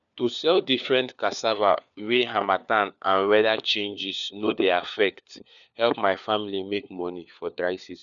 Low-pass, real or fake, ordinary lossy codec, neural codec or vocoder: 7.2 kHz; fake; none; codec, 16 kHz, 4 kbps, FunCodec, trained on LibriTTS, 50 frames a second